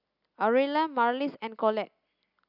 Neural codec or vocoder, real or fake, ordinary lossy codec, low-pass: none; real; none; 5.4 kHz